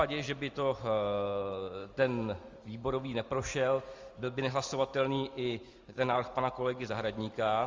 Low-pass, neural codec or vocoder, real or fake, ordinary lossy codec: 7.2 kHz; none; real; Opus, 32 kbps